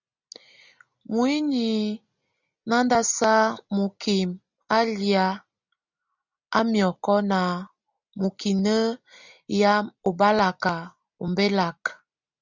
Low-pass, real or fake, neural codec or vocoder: 7.2 kHz; real; none